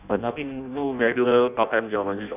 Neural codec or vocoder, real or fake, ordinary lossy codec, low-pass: codec, 16 kHz in and 24 kHz out, 0.6 kbps, FireRedTTS-2 codec; fake; none; 3.6 kHz